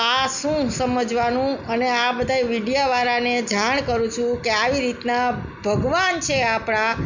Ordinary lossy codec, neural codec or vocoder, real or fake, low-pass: none; none; real; 7.2 kHz